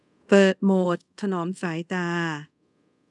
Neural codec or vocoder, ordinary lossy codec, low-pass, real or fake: codec, 24 kHz, 0.5 kbps, DualCodec; none; 10.8 kHz; fake